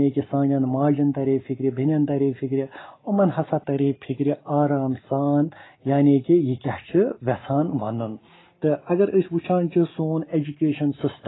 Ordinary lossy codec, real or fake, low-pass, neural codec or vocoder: AAC, 16 kbps; real; 7.2 kHz; none